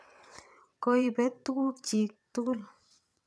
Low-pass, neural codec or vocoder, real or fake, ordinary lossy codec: none; vocoder, 22.05 kHz, 80 mel bands, WaveNeXt; fake; none